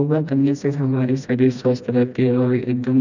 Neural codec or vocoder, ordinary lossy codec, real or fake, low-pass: codec, 16 kHz, 1 kbps, FreqCodec, smaller model; none; fake; 7.2 kHz